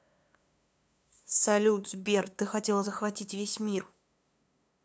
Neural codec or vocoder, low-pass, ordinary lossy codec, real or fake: codec, 16 kHz, 2 kbps, FunCodec, trained on LibriTTS, 25 frames a second; none; none; fake